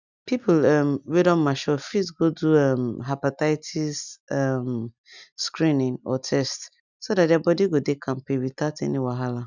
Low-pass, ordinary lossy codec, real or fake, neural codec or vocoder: 7.2 kHz; none; real; none